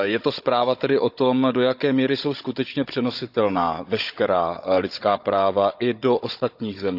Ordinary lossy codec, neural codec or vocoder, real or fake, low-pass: none; codec, 16 kHz, 16 kbps, FunCodec, trained on Chinese and English, 50 frames a second; fake; 5.4 kHz